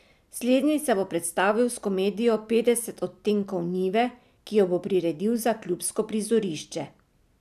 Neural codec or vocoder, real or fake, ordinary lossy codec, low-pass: none; real; none; 14.4 kHz